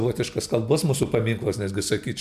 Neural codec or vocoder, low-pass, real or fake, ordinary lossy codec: none; 14.4 kHz; real; MP3, 96 kbps